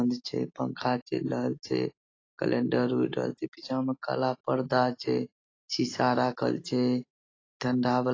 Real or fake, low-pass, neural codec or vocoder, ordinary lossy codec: real; 7.2 kHz; none; AAC, 32 kbps